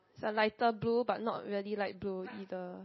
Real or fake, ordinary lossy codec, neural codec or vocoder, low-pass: real; MP3, 24 kbps; none; 7.2 kHz